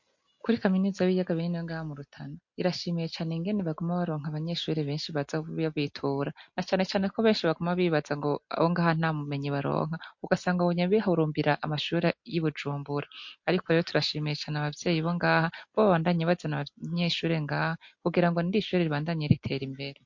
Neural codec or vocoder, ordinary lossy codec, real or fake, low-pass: none; MP3, 48 kbps; real; 7.2 kHz